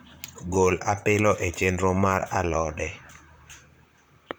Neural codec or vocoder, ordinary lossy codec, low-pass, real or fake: vocoder, 44.1 kHz, 128 mel bands, Pupu-Vocoder; none; none; fake